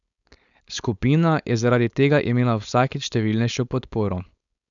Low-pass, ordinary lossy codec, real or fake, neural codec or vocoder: 7.2 kHz; none; fake; codec, 16 kHz, 4.8 kbps, FACodec